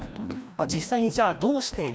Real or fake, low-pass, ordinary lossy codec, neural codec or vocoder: fake; none; none; codec, 16 kHz, 1 kbps, FreqCodec, larger model